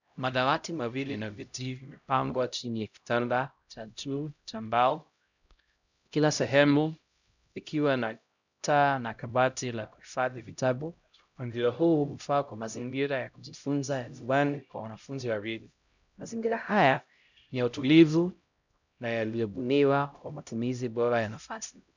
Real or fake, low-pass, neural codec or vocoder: fake; 7.2 kHz; codec, 16 kHz, 0.5 kbps, X-Codec, HuBERT features, trained on LibriSpeech